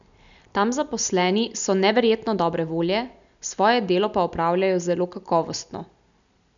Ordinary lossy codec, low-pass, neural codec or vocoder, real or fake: none; 7.2 kHz; none; real